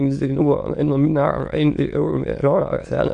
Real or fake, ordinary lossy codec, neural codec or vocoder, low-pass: fake; AAC, 64 kbps; autoencoder, 22.05 kHz, a latent of 192 numbers a frame, VITS, trained on many speakers; 9.9 kHz